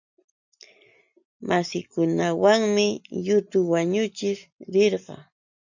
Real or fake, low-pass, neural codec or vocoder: real; 7.2 kHz; none